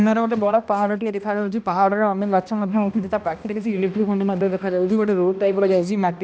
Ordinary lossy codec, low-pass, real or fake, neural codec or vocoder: none; none; fake; codec, 16 kHz, 1 kbps, X-Codec, HuBERT features, trained on balanced general audio